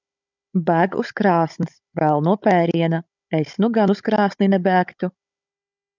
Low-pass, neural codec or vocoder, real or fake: 7.2 kHz; codec, 16 kHz, 16 kbps, FunCodec, trained on Chinese and English, 50 frames a second; fake